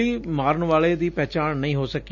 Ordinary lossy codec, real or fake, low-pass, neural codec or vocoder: none; real; 7.2 kHz; none